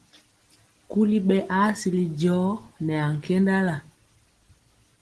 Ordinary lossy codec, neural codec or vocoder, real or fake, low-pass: Opus, 16 kbps; none; real; 10.8 kHz